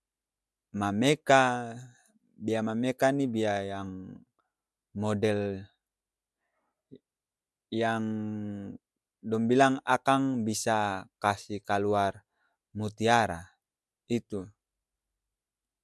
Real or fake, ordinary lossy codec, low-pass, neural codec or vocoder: real; none; none; none